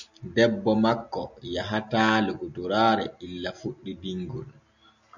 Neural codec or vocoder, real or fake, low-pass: none; real; 7.2 kHz